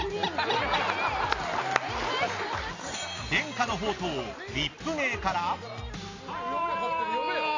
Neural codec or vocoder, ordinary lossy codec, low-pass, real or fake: none; AAC, 32 kbps; 7.2 kHz; real